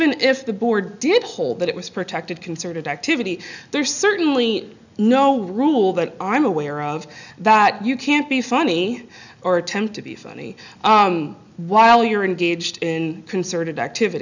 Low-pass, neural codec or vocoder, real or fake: 7.2 kHz; none; real